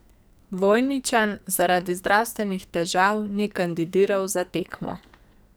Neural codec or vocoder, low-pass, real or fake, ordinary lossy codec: codec, 44.1 kHz, 2.6 kbps, SNAC; none; fake; none